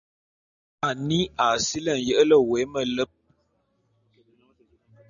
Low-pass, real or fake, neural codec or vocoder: 7.2 kHz; real; none